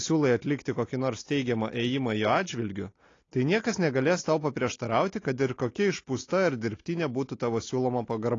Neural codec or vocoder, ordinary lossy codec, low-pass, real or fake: none; AAC, 32 kbps; 7.2 kHz; real